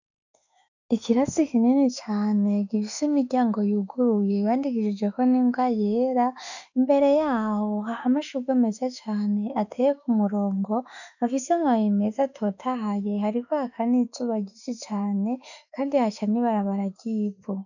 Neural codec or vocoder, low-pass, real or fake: autoencoder, 48 kHz, 32 numbers a frame, DAC-VAE, trained on Japanese speech; 7.2 kHz; fake